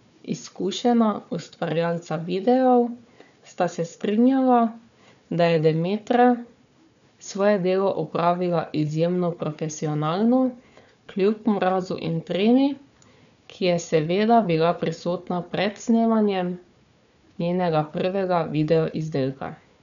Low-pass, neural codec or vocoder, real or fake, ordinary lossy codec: 7.2 kHz; codec, 16 kHz, 4 kbps, FunCodec, trained on Chinese and English, 50 frames a second; fake; none